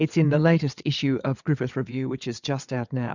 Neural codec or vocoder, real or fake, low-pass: vocoder, 22.05 kHz, 80 mel bands, WaveNeXt; fake; 7.2 kHz